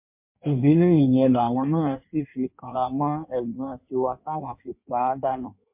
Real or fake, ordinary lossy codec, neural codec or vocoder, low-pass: fake; MP3, 32 kbps; codec, 16 kHz in and 24 kHz out, 1.1 kbps, FireRedTTS-2 codec; 3.6 kHz